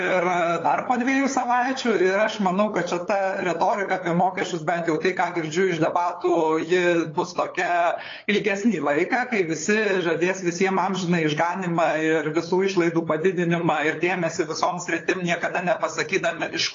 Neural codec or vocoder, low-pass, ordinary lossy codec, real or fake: codec, 16 kHz, 8 kbps, FunCodec, trained on LibriTTS, 25 frames a second; 7.2 kHz; AAC, 32 kbps; fake